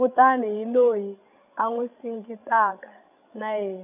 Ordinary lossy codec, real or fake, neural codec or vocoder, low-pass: none; fake; codec, 16 kHz, 16 kbps, FreqCodec, larger model; 3.6 kHz